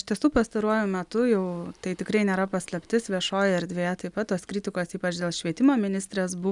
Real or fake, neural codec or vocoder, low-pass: real; none; 10.8 kHz